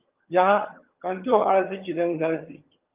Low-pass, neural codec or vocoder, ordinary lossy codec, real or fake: 3.6 kHz; vocoder, 22.05 kHz, 80 mel bands, HiFi-GAN; Opus, 32 kbps; fake